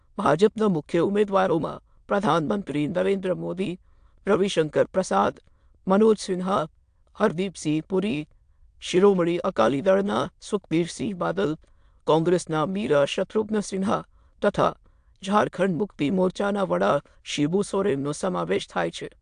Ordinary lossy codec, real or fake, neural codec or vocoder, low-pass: AAC, 64 kbps; fake; autoencoder, 22.05 kHz, a latent of 192 numbers a frame, VITS, trained on many speakers; 9.9 kHz